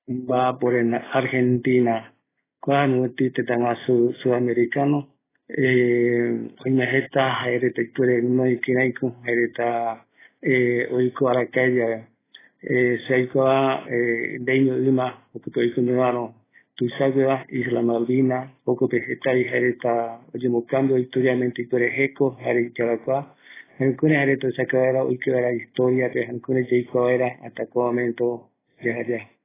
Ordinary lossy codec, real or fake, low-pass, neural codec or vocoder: AAC, 16 kbps; real; 3.6 kHz; none